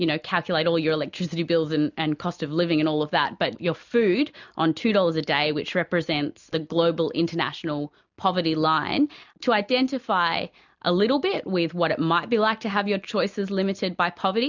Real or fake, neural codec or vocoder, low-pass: real; none; 7.2 kHz